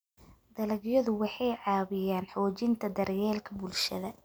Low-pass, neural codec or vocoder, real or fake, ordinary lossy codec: none; none; real; none